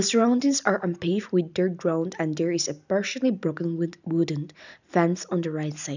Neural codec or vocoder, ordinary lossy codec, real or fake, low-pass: none; none; real; 7.2 kHz